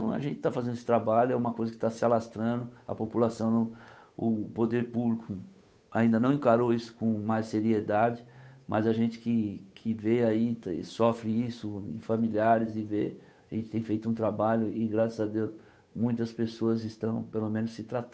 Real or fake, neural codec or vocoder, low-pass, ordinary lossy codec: fake; codec, 16 kHz, 8 kbps, FunCodec, trained on Chinese and English, 25 frames a second; none; none